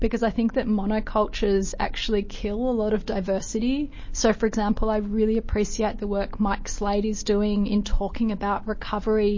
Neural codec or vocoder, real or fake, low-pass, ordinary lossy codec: none; real; 7.2 kHz; MP3, 32 kbps